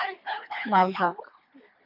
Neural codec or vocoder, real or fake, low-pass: codec, 24 kHz, 3 kbps, HILCodec; fake; 5.4 kHz